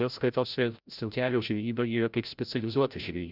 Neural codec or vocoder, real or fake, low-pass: codec, 16 kHz, 0.5 kbps, FreqCodec, larger model; fake; 5.4 kHz